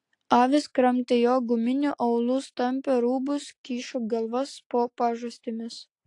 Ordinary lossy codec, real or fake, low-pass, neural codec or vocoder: AAC, 48 kbps; real; 10.8 kHz; none